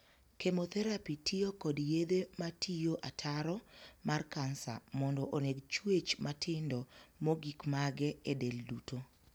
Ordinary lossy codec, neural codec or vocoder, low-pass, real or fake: none; none; none; real